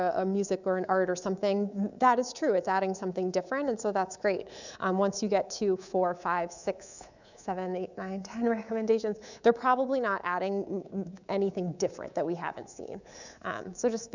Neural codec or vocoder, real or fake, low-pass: codec, 24 kHz, 3.1 kbps, DualCodec; fake; 7.2 kHz